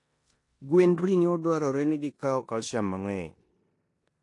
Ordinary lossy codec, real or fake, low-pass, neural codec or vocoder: AAC, 48 kbps; fake; 10.8 kHz; codec, 16 kHz in and 24 kHz out, 0.9 kbps, LongCat-Audio-Codec, four codebook decoder